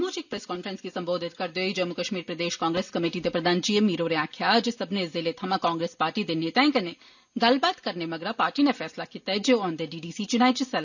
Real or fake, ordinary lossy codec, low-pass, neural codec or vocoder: real; none; 7.2 kHz; none